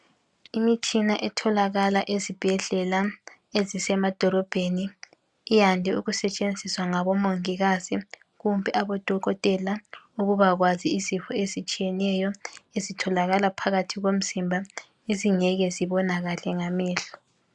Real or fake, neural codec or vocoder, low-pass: real; none; 10.8 kHz